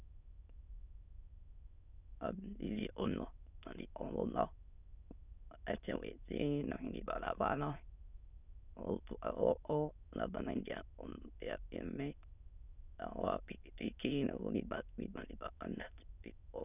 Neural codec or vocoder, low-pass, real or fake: autoencoder, 22.05 kHz, a latent of 192 numbers a frame, VITS, trained on many speakers; 3.6 kHz; fake